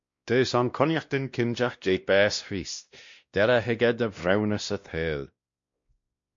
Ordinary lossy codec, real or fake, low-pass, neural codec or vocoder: MP3, 48 kbps; fake; 7.2 kHz; codec, 16 kHz, 1 kbps, X-Codec, WavLM features, trained on Multilingual LibriSpeech